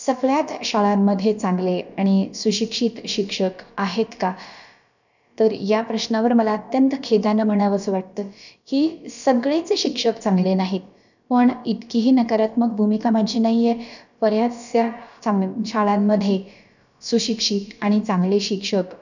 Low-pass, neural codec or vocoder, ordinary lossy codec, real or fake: 7.2 kHz; codec, 16 kHz, about 1 kbps, DyCAST, with the encoder's durations; none; fake